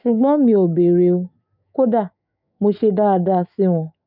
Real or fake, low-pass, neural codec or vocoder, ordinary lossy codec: fake; 5.4 kHz; autoencoder, 48 kHz, 128 numbers a frame, DAC-VAE, trained on Japanese speech; none